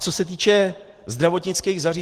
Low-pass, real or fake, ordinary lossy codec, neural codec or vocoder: 14.4 kHz; real; Opus, 16 kbps; none